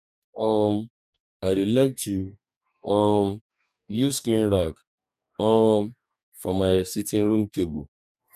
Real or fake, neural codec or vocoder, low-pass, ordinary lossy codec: fake; codec, 44.1 kHz, 2.6 kbps, DAC; 14.4 kHz; none